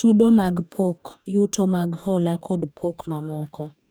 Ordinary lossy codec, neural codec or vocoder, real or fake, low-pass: none; codec, 44.1 kHz, 2.6 kbps, DAC; fake; none